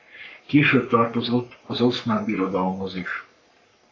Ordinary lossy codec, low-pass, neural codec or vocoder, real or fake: AAC, 32 kbps; 7.2 kHz; codec, 44.1 kHz, 3.4 kbps, Pupu-Codec; fake